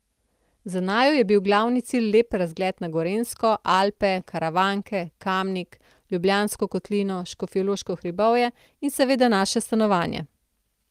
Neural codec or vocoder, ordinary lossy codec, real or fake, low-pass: none; Opus, 24 kbps; real; 14.4 kHz